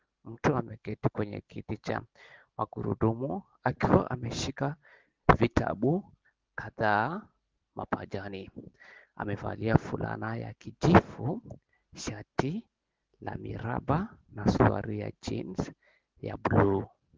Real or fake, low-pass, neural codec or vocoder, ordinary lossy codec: real; 7.2 kHz; none; Opus, 16 kbps